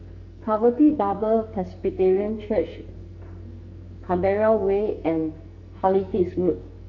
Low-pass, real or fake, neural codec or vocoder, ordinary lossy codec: 7.2 kHz; fake; codec, 44.1 kHz, 2.6 kbps, SNAC; none